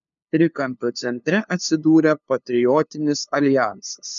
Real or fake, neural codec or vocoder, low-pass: fake; codec, 16 kHz, 2 kbps, FunCodec, trained on LibriTTS, 25 frames a second; 7.2 kHz